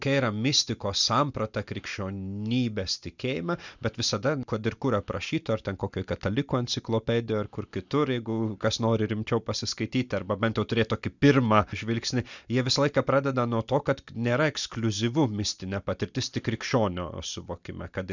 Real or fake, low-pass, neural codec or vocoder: real; 7.2 kHz; none